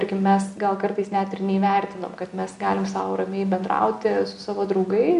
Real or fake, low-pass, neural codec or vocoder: real; 10.8 kHz; none